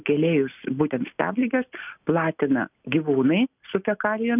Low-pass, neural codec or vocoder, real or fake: 3.6 kHz; none; real